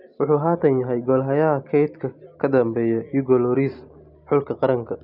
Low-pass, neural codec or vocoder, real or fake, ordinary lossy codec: 5.4 kHz; none; real; none